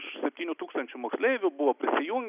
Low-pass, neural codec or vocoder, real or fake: 3.6 kHz; none; real